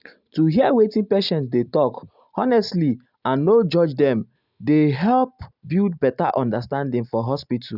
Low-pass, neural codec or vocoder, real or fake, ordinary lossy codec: 5.4 kHz; none; real; none